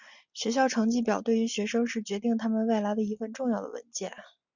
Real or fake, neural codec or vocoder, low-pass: real; none; 7.2 kHz